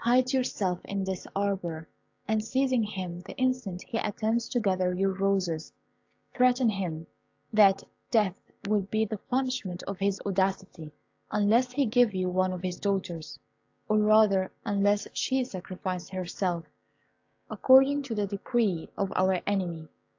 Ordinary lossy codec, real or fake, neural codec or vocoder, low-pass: AAC, 48 kbps; fake; codec, 16 kHz, 6 kbps, DAC; 7.2 kHz